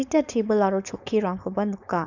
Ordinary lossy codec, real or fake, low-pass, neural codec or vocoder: none; fake; 7.2 kHz; codec, 16 kHz, 4.8 kbps, FACodec